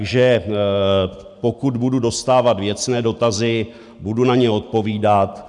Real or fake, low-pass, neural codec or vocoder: real; 10.8 kHz; none